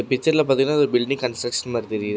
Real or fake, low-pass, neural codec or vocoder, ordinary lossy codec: real; none; none; none